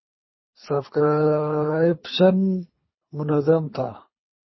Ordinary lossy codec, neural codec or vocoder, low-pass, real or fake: MP3, 24 kbps; codec, 24 kHz, 3 kbps, HILCodec; 7.2 kHz; fake